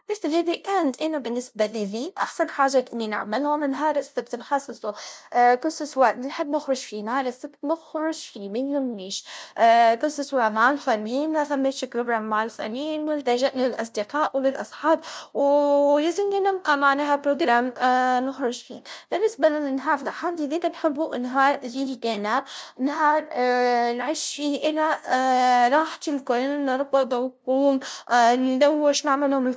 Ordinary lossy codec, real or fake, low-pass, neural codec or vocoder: none; fake; none; codec, 16 kHz, 0.5 kbps, FunCodec, trained on LibriTTS, 25 frames a second